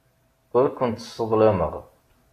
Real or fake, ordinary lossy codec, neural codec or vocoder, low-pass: real; AAC, 48 kbps; none; 14.4 kHz